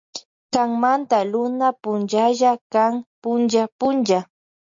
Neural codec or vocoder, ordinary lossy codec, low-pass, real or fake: none; MP3, 48 kbps; 7.2 kHz; real